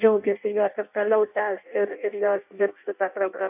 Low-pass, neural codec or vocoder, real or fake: 3.6 kHz; codec, 16 kHz in and 24 kHz out, 0.6 kbps, FireRedTTS-2 codec; fake